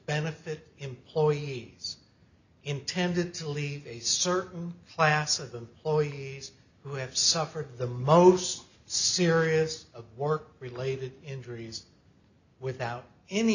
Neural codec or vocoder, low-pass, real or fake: none; 7.2 kHz; real